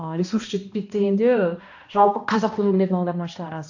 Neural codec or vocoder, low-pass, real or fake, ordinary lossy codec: codec, 16 kHz, 1 kbps, X-Codec, HuBERT features, trained on balanced general audio; 7.2 kHz; fake; AAC, 48 kbps